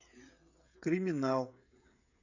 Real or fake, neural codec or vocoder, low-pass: fake; codec, 16 kHz, 16 kbps, FreqCodec, smaller model; 7.2 kHz